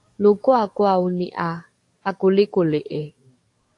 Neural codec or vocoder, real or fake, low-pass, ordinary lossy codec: codec, 44.1 kHz, 7.8 kbps, DAC; fake; 10.8 kHz; AAC, 48 kbps